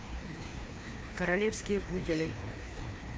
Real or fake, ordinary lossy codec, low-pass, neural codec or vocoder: fake; none; none; codec, 16 kHz, 2 kbps, FreqCodec, larger model